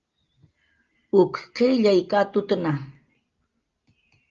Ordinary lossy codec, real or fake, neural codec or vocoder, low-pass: Opus, 32 kbps; real; none; 7.2 kHz